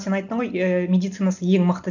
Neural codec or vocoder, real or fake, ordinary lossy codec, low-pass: none; real; none; 7.2 kHz